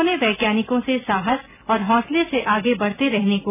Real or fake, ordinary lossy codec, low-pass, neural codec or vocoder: real; none; 3.6 kHz; none